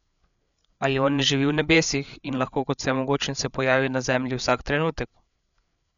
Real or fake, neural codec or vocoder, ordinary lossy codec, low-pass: fake; codec, 16 kHz, 8 kbps, FreqCodec, larger model; MP3, 96 kbps; 7.2 kHz